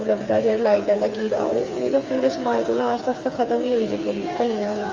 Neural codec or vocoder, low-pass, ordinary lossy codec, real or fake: codec, 16 kHz, 4 kbps, FreqCodec, smaller model; 7.2 kHz; Opus, 32 kbps; fake